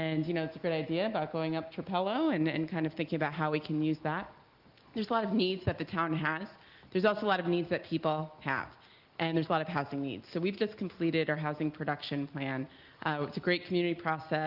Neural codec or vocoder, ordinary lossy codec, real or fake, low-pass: none; Opus, 32 kbps; real; 5.4 kHz